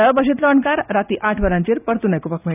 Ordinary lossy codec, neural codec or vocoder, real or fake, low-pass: none; none; real; 3.6 kHz